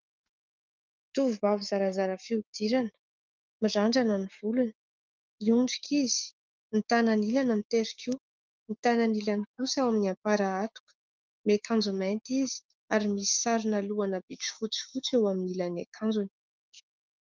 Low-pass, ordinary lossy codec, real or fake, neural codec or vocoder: 7.2 kHz; Opus, 24 kbps; fake; codec, 16 kHz, 6 kbps, DAC